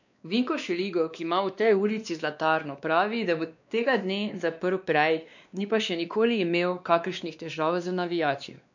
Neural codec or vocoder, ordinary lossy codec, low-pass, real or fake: codec, 16 kHz, 2 kbps, X-Codec, WavLM features, trained on Multilingual LibriSpeech; none; 7.2 kHz; fake